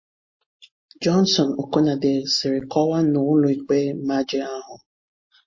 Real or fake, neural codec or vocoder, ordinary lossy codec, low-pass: real; none; MP3, 32 kbps; 7.2 kHz